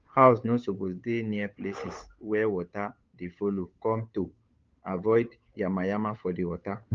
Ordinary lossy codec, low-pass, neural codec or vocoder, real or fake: Opus, 24 kbps; 7.2 kHz; codec, 16 kHz, 8 kbps, FunCodec, trained on Chinese and English, 25 frames a second; fake